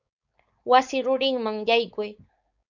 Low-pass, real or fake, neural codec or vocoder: 7.2 kHz; fake; codec, 16 kHz, 4.8 kbps, FACodec